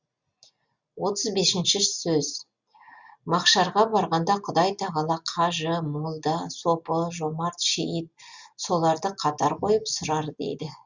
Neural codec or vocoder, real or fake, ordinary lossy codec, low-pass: none; real; Opus, 64 kbps; 7.2 kHz